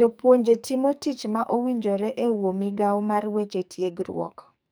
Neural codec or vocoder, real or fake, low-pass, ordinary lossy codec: codec, 44.1 kHz, 2.6 kbps, SNAC; fake; none; none